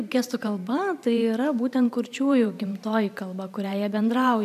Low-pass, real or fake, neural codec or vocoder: 14.4 kHz; fake; vocoder, 44.1 kHz, 128 mel bands every 512 samples, BigVGAN v2